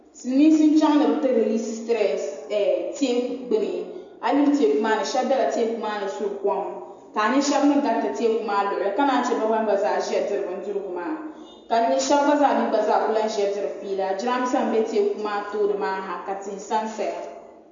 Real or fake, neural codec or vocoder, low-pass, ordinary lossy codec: real; none; 7.2 kHz; AAC, 64 kbps